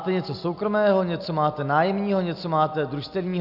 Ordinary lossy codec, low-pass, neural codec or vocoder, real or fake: AAC, 48 kbps; 5.4 kHz; none; real